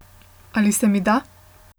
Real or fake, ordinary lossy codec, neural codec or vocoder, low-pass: real; none; none; none